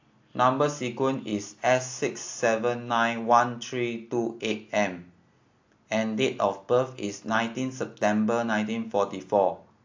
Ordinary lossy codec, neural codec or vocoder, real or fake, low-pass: AAC, 48 kbps; none; real; 7.2 kHz